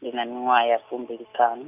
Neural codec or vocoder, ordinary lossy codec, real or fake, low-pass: none; none; real; 3.6 kHz